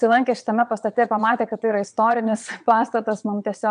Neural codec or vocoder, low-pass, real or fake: vocoder, 22.05 kHz, 80 mel bands, WaveNeXt; 9.9 kHz; fake